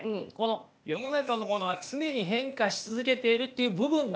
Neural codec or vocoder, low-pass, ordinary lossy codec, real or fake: codec, 16 kHz, 0.8 kbps, ZipCodec; none; none; fake